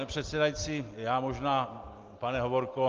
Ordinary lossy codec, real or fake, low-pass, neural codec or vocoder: Opus, 32 kbps; real; 7.2 kHz; none